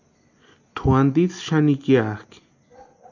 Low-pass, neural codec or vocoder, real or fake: 7.2 kHz; none; real